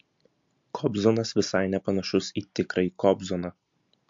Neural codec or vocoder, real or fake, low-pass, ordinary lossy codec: none; real; 7.2 kHz; MP3, 48 kbps